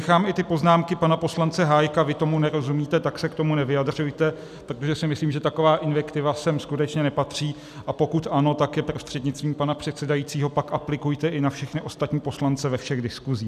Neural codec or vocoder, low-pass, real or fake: none; 14.4 kHz; real